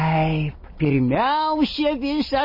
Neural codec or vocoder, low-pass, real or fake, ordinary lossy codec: none; 5.4 kHz; real; MP3, 24 kbps